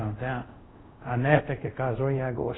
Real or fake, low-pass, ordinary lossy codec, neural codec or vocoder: fake; 7.2 kHz; AAC, 16 kbps; codec, 24 kHz, 0.5 kbps, DualCodec